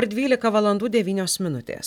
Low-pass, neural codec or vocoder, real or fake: 19.8 kHz; none; real